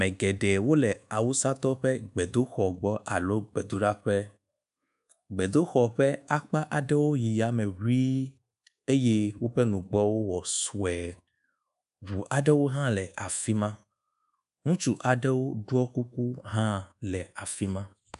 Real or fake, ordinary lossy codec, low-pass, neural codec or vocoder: fake; AAC, 96 kbps; 10.8 kHz; codec, 24 kHz, 1.2 kbps, DualCodec